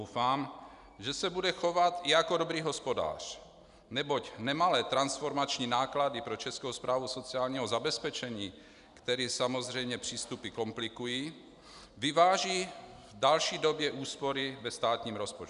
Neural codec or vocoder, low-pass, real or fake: none; 10.8 kHz; real